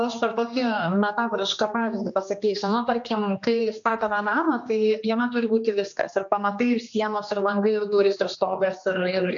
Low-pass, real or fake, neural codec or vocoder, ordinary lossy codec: 7.2 kHz; fake; codec, 16 kHz, 2 kbps, X-Codec, HuBERT features, trained on general audio; AAC, 64 kbps